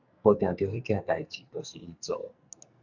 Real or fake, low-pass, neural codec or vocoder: fake; 7.2 kHz; codec, 44.1 kHz, 2.6 kbps, SNAC